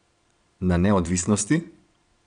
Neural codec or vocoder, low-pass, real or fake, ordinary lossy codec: vocoder, 22.05 kHz, 80 mel bands, Vocos; 9.9 kHz; fake; none